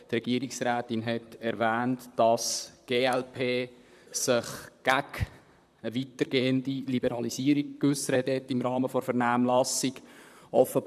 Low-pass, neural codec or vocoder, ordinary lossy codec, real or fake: 14.4 kHz; vocoder, 44.1 kHz, 128 mel bands, Pupu-Vocoder; none; fake